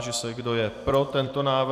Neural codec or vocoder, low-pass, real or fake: vocoder, 44.1 kHz, 128 mel bands every 256 samples, BigVGAN v2; 14.4 kHz; fake